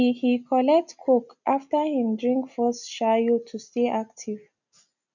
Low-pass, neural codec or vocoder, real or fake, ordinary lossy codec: 7.2 kHz; none; real; none